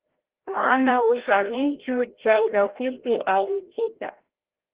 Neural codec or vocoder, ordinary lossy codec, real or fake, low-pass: codec, 16 kHz, 0.5 kbps, FreqCodec, larger model; Opus, 16 kbps; fake; 3.6 kHz